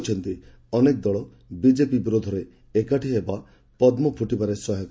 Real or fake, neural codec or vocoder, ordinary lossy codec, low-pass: real; none; none; none